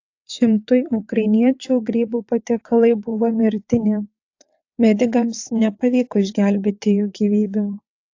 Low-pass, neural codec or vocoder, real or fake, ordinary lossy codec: 7.2 kHz; vocoder, 22.05 kHz, 80 mel bands, WaveNeXt; fake; AAC, 48 kbps